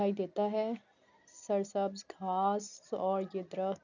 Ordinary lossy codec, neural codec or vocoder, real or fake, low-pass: none; none; real; 7.2 kHz